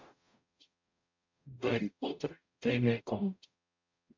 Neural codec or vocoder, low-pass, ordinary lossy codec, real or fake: codec, 44.1 kHz, 0.9 kbps, DAC; 7.2 kHz; MP3, 64 kbps; fake